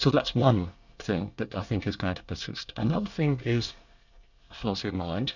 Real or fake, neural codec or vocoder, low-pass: fake; codec, 24 kHz, 1 kbps, SNAC; 7.2 kHz